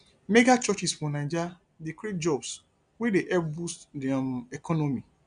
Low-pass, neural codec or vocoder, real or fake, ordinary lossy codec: 9.9 kHz; none; real; none